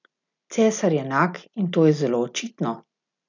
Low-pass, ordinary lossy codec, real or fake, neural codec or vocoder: 7.2 kHz; none; real; none